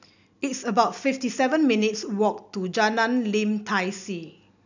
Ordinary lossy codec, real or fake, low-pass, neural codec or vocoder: none; real; 7.2 kHz; none